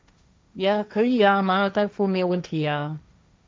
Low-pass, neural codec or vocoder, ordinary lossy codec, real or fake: none; codec, 16 kHz, 1.1 kbps, Voila-Tokenizer; none; fake